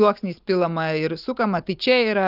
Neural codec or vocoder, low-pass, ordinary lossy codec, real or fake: none; 5.4 kHz; Opus, 32 kbps; real